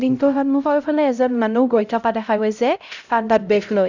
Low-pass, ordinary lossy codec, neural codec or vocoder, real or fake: 7.2 kHz; none; codec, 16 kHz, 0.5 kbps, X-Codec, HuBERT features, trained on LibriSpeech; fake